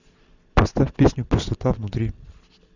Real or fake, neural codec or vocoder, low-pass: real; none; 7.2 kHz